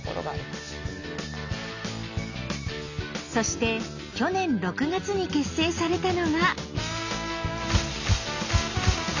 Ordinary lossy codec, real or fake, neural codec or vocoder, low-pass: none; real; none; 7.2 kHz